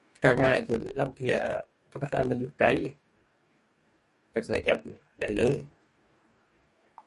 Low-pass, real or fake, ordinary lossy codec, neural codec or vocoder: 14.4 kHz; fake; MP3, 48 kbps; codec, 44.1 kHz, 2.6 kbps, DAC